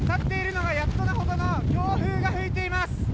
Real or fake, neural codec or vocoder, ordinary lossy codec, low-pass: real; none; none; none